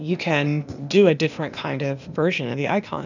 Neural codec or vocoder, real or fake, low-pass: codec, 16 kHz, 0.8 kbps, ZipCodec; fake; 7.2 kHz